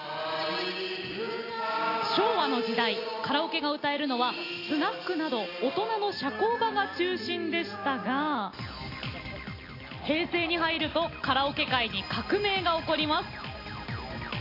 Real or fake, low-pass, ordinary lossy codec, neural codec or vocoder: real; 5.4 kHz; AAC, 32 kbps; none